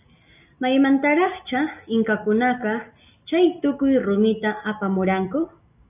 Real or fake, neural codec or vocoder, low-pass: real; none; 3.6 kHz